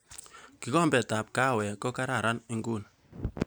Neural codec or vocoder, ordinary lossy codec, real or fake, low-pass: none; none; real; none